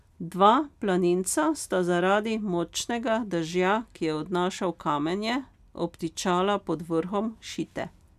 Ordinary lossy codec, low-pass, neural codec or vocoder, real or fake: none; 14.4 kHz; none; real